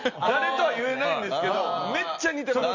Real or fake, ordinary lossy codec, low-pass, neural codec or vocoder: real; none; 7.2 kHz; none